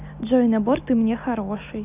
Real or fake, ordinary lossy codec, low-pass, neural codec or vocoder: real; none; 3.6 kHz; none